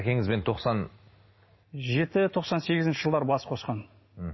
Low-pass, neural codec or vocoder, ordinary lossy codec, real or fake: 7.2 kHz; none; MP3, 24 kbps; real